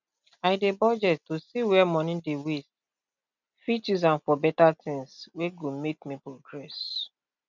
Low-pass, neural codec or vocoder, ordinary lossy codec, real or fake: 7.2 kHz; none; none; real